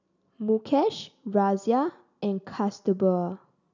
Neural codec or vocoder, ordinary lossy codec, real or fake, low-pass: none; none; real; 7.2 kHz